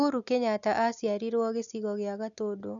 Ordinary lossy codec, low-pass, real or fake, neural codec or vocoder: none; 7.2 kHz; real; none